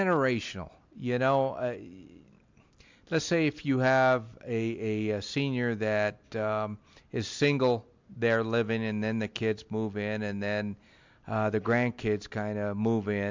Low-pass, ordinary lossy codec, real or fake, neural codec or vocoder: 7.2 kHz; MP3, 64 kbps; real; none